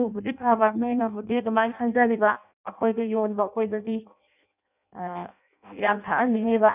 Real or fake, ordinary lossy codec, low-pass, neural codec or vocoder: fake; none; 3.6 kHz; codec, 16 kHz in and 24 kHz out, 0.6 kbps, FireRedTTS-2 codec